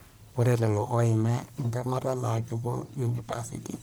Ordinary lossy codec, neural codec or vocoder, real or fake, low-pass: none; codec, 44.1 kHz, 1.7 kbps, Pupu-Codec; fake; none